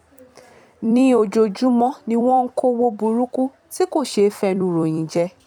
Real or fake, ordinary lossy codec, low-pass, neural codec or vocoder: fake; none; 19.8 kHz; vocoder, 44.1 kHz, 128 mel bands every 256 samples, BigVGAN v2